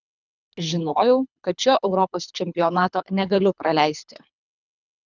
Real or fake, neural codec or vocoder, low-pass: fake; codec, 24 kHz, 3 kbps, HILCodec; 7.2 kHz